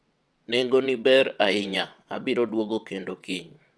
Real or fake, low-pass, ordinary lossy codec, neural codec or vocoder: fake; none; none; vocoder, 22.05 kHz, 80 mel bands, WaveNeXt